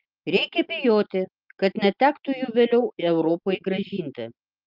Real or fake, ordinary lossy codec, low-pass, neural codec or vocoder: real; Opus, 24 kbps; 5.4 kHz; none